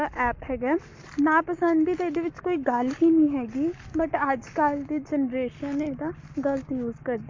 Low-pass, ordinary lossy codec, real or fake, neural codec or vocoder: 7.2 kHz; MP3, 48 kbps; fake; codec, 44.1 kHz, 7.8 kbps, Pupu-Codec